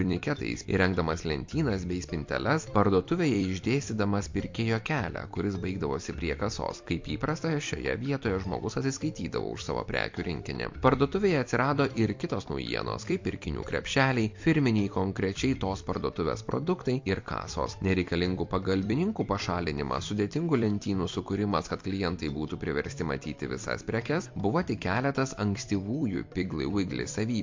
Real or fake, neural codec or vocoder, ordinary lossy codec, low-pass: fake; vocoder, 44.1 kHz, 128 mel bands every 256 samples, BigVGAN v2; MP3, 48 kbps; 7.2 kHz